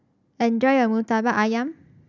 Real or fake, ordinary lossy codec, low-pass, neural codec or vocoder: real; none; 7.2 kHz; none